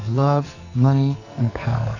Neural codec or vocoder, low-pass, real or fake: codec, 44.1 kHz, 2.6 kbps, SNAC; 7.2 kHz; fake